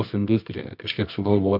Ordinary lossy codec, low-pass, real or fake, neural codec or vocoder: MP3, 48 kbps; 5.4 kHz; fake; codec, 24 kHz, 0.9 kbps, WavTokenizer, medium music audio release